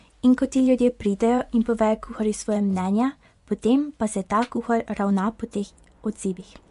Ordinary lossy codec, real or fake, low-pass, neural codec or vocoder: MP3, 64 kbps; fake; 10.8 kHz; vocoder, 24 kHz, 100 mel bands, Vocos